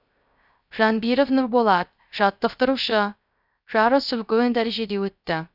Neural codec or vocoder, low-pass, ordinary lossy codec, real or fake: codec, 16 kHz, 0.3 kbps, FocalCodec; 5.4 kHz; none; fake